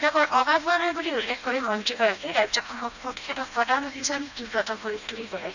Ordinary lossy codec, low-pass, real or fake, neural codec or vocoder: none; 7.2 kHz; fake; codec, 16 kHz, 1 kbps, FreqCodec, smaller model